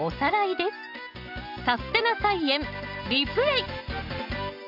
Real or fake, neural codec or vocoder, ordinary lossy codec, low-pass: real; none; none; 5.4 kHz